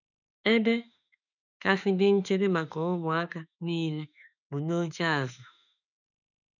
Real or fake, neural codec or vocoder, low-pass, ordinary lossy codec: fake; autoencoder, 48 kHz, 32 numbers a frame, DAC-VAE, trained on Japanese speech; 7.2 kHz; none